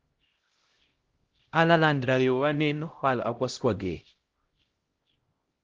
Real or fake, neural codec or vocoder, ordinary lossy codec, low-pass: fake; codec, 16 kHz, 0.5 kbps, X-Codec, HuBERT features, trained on LibriSpeech; Opus, 16 kbps; 7.2 kHz